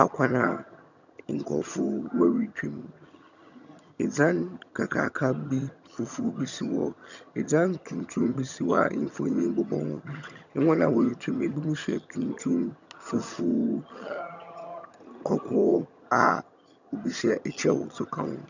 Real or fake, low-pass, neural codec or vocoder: fake; 7.2 kHz; vocoder, 22.05 kHz, 80 mel bands, HiFi-GAN